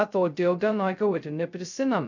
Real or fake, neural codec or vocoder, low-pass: fake; codec, 16 kHz, 0.2 kbps, FocalCodec; 7.2 kHz